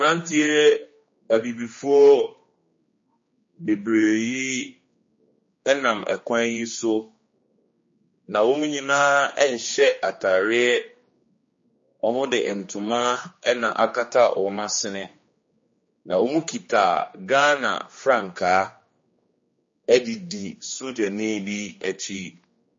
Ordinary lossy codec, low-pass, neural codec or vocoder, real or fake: MP3, 32 kbps; 7.2 kHz; codec, 16 kHz, 2 kbps, X-Codec, HuBERT features, trained on general audio; fake